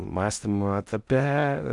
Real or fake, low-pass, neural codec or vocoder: fake; 10.8 kHz; codec, 16 kHz in and 24 kHz out, 0.6 kbps, FocalCodec, streaming, 4096 codes